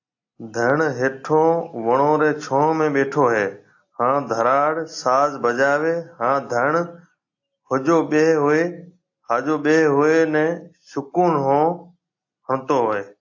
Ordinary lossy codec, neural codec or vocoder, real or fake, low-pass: AAC, 48 kbps; none; real; 7.2 kHz